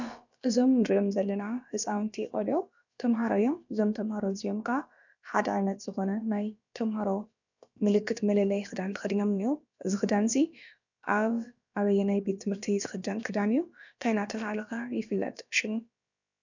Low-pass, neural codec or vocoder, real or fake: 7.2 kHz; codec, 16 kHz, about 1 kbps, DyCAST, with the encoder's durations; fake